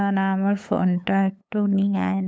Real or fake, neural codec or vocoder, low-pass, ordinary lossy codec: fake; codec, 16 kHz, 8 kbps, FunCodec, trained on LibriTTS, 25 frames a second; none; none